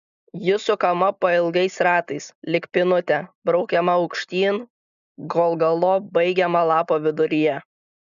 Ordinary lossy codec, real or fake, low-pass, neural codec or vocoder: AAC, 96 kbps; real; 7.2 kHz; none